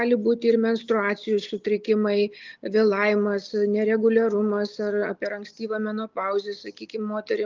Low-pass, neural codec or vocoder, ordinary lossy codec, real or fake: 7.2 kHz; none; Opus, 24 kbps; real